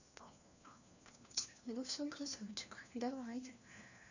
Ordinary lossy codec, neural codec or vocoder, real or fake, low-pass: none; codec, 16 kHz, 1 kbps, FunCodec, trained on LibriTTS, 50 frames a second; fake; 7.2 kHz